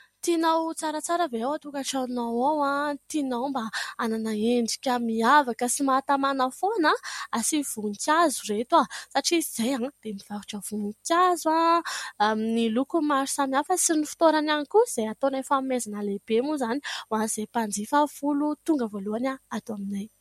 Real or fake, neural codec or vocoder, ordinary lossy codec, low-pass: real; none; MP3, 64 kbps; 19.8 kHz